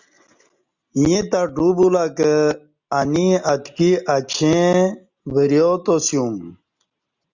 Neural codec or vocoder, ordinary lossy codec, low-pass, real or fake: none; Opus, 64 kbps; 7.2 kHz; real